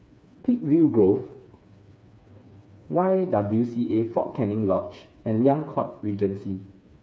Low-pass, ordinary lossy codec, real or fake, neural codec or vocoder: none; none; fake; codec, 16 kHz, 4 kbps, FreqCodec, smaller model